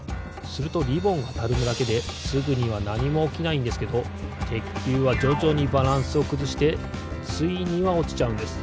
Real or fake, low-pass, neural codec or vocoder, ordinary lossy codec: real; none; none; none